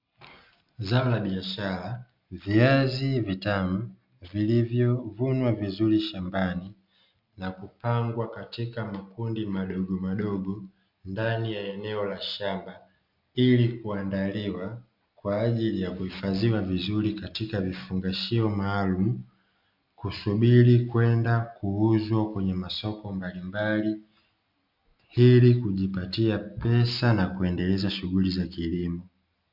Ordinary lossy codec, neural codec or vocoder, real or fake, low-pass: MP3, 48 kbps; none; real; 5.4 kHz